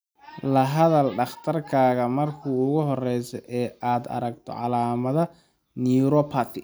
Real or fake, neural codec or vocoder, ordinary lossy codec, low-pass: real; none; none; none